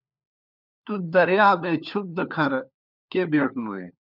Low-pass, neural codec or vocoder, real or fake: 5.4 kHz; codec, 16 kHz, 4 kbps, FunCodec, trained on LibriTTS, 50 frames a second; fake